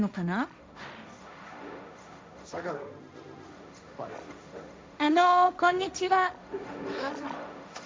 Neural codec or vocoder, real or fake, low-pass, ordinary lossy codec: codec, 16 kHz, 1.1 kbps, Voila-Tokenizer; fake; none; none